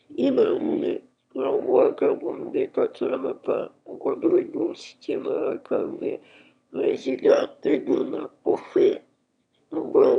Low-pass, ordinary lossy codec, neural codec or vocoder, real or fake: 9.9 kHz; none; autoencoder, 22.05 kHz, a latent of 192 numbers a frame, VITS, trained on one speaker; fake